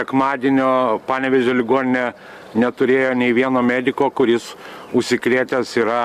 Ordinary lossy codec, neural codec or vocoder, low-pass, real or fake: AAC, 96 kbps; none; 14.4 kHz; real